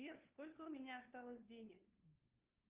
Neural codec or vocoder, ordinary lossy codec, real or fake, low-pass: codec, 16 kHz, 2 kbps, FunCodec, trained on LibriTTS, 25 frames a second; Opus, 32 kbps; fake; 3.6 kHz